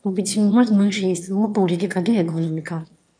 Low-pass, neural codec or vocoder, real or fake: 9.9 kHz; autoencoder, 22.05 kHz, a latent of 192 numbers a frame, VITS, trained on one speaker; fake